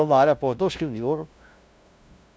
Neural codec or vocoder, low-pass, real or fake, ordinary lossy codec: codec, 16 kHz, 0.5 kbps, FunCodec, trained on LibriTTS, 25 frames a second; none; fake; none